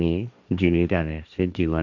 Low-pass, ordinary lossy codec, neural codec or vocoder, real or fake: 7.2 kHz; none; codec, 16 kHz, 1.1 kbps, Voila-Tokenizer; fake